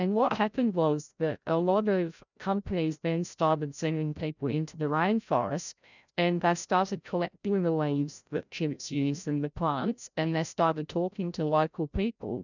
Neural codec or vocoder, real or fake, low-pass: codec, 16 kHz, 0.5 kbps, FreqCodec, larger model; fake; 7.2 kHz